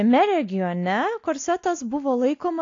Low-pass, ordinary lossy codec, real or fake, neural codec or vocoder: 7.2 kHz; AAC, 48 kbps; real; none